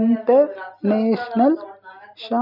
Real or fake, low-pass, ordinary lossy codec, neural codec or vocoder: real; 5.4 kHz; none; none